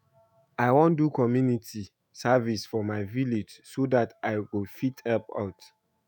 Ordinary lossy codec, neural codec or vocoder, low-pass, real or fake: none; autoencoder, 48 kHz, 128 numbers a frame, DAC-VAE, trained on Japanese speech; none; fake